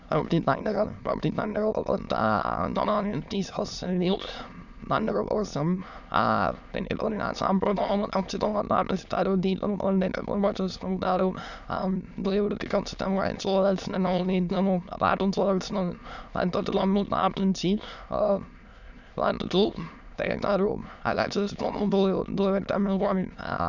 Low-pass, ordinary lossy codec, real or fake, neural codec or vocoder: 7.2 kHz; none; fake; autoencoder, 22.05 kHz, a latent of 192 numbers a frame, VITS, trained on many speakers